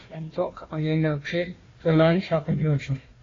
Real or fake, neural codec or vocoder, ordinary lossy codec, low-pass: fake; codec, 16 kHz, 1 kbps, FunCodec, trained on Chinese and English, 50 frames a second; AAC, 32 kbps; 7.2 kHz